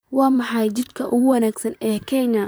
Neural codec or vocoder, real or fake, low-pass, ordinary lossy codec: vocoder, 44.1 kHz, 128 mel bands, Pupu-Vocoder; fake; none; none